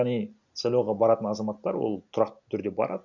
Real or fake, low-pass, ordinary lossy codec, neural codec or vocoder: real; 7.2 kHz; MP3, 64 kbps; none